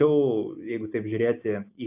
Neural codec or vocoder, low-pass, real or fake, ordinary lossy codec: none; 3.6 kHz; real; AAC, 32 kbps